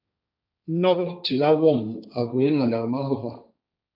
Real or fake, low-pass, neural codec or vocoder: fake; 5.4 kHz; codec, 16 kHz, 1.1 kbps, Voila-Tokenizer